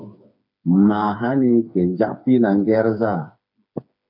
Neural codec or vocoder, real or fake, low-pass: codec, 16 kHz, 4 kbps, FreqCodec, smaller model; fake; 5.4 kHz